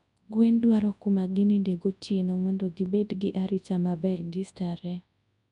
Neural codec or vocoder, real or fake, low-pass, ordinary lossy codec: codec, 24 kHz, 0.9 kbps, WavTokenizer, large speech release; fake; 10.8 kHz; none